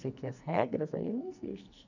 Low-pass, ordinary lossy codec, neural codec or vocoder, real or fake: 7.2 kHz; none; codec, 44.1 kHz, 2.6 kbps, SNAC; fake